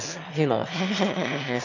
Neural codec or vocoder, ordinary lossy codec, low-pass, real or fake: autoencoder, 22.05 kHz, a latent of 192 numbers a frame, VITS, trained on one speaker; none; 7.2 kHz; fake